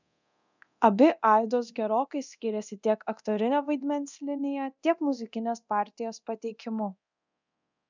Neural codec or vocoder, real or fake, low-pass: codec, 24 kHz, 0.9 kbps, DualCodec; fake; 7.2 kHz